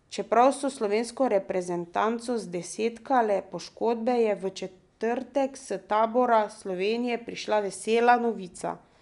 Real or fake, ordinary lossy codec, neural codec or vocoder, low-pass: real; none; none; 10.8 kHz